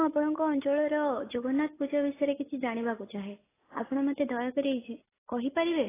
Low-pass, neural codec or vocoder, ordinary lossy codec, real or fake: 3.6 kHz; none; AAC, 16 kbps; real